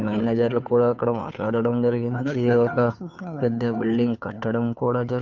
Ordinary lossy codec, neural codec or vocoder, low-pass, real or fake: none; codec, 16 kHz, 2 kbps, FunCodec, trained on Chinese and English, 25 frames a second; 7.2 kHz; fake